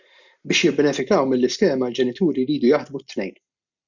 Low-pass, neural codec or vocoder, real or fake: 7.2 kHz; none; real